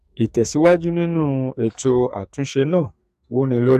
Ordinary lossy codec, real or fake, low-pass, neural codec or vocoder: AAC, 96 kbps; fake; 14.4 kHz; codec, 44.1 kHz, 2.6 kbps, SNAC